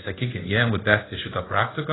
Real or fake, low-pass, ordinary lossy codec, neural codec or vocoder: fake; 7.2 kHz; AAC, 16 kbps; codec, 24 kHz, 0.5 kbps, DualCodec